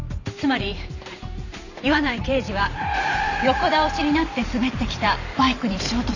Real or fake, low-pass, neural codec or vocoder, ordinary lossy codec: fake; 7.2 kHz; vocoder, 44.1 kHz, 128 mel bands every 512 samples, BigVGAN v2; none